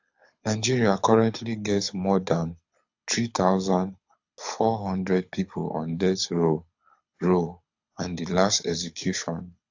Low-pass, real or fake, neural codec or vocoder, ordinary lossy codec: 7.2 kHz; fake; codec, 24 kHz, 6 kbps, HILCodec; AAC, 48 kbps